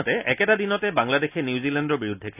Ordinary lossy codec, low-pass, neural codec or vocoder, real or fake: none; 3.6 kHz; none; real